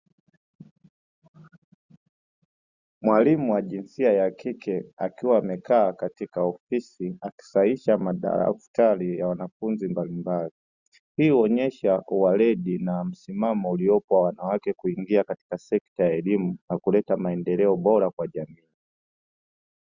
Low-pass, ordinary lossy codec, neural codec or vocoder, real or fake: 7.2 kHz; Opus, 64 kbps; none; real